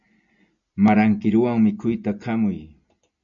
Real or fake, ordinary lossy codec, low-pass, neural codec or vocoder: real; MP3, 96 kbps; 7.2 kHz; none